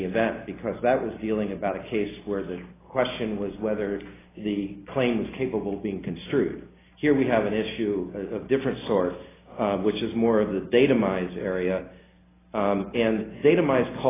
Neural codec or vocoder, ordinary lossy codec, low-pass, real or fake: none; AAC, 16 kbps; 3.6 kHz; real